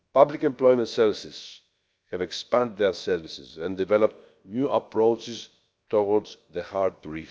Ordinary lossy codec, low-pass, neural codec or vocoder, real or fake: none; none; codec, 16 kHz, about 1 kbps, DyCAST, with the encoder's durations; fake